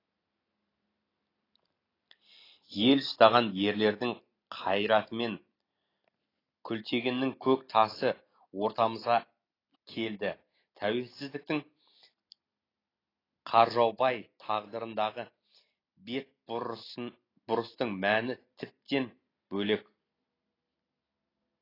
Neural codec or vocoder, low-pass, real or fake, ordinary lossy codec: none; 5.4 kHz; real; AAC, 24 kbps